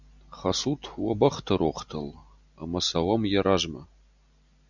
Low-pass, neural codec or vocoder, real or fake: 7.2 kHz; none; real